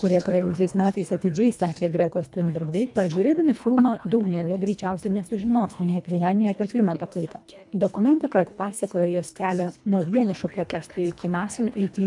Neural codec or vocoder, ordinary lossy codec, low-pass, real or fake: codec, 24 kHz, 1.5 kbps, HILCodec; MP3, 96 kbps; 10.8 kHz; fake